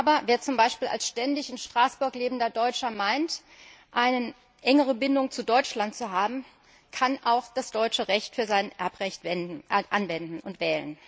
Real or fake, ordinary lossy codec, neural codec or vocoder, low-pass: real; none; none; none